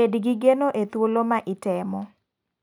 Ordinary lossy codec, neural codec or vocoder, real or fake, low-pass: none; none; real; 19.8 kHz